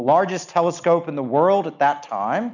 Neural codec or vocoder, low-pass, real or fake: none; 7.2 kHz; real